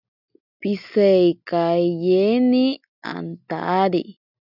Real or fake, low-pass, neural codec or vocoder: real; 5.4 kHz; none